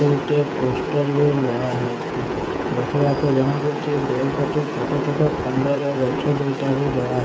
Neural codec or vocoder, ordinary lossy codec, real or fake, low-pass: codec, 16 kHz, 16 kbps, FreqCodec, smaller model; none; fake; none